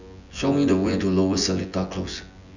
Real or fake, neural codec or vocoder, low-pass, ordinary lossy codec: fake; vocoder, 24 kHz, 100 mel bands, Vocos; 7.2 kHz; none